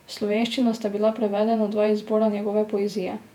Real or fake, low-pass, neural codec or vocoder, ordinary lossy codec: fake; 19.8 kHz; vocoder, 48 kHz, 128 mel bands, Vocos; none